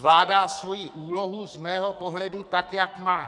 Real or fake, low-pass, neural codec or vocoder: fake; 10.8 kHz; codec, 44.1 kHz, 2.6 kbps, SNAC